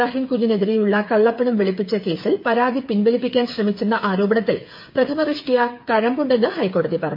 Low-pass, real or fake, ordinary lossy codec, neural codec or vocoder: 5.4 kHz; fake; MP3, 32 kbps; codec, 16 kHz, 8 kbps, FreqCodec, smaller model